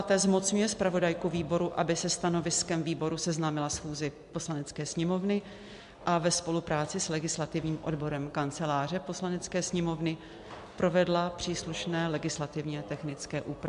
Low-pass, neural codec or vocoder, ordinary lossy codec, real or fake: 10.8 kHz; none; MP3, 64 kbps; real